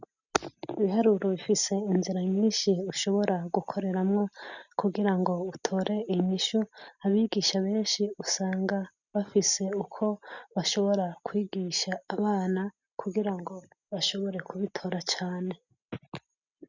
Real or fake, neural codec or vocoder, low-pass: real; none; 7.2 kHz